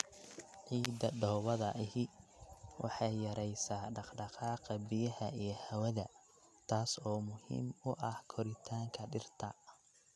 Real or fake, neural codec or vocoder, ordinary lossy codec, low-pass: real; none; none; none